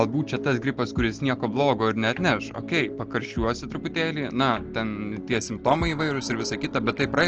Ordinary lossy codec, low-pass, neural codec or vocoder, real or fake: Opus, 16 kbps; 7.2 kHz; none; real